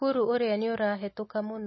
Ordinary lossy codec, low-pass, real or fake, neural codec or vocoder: MP3, 24 kbps; 7.2 kHz; real; none